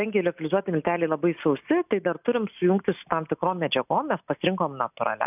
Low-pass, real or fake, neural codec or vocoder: 3.6 kHz; real; none